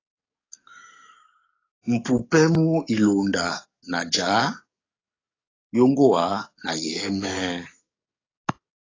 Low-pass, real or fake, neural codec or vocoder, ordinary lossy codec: 7.2 kHz; fake; codec, 44.1 kHz, 7.8 kbps, DAC; AAC, 32 kbps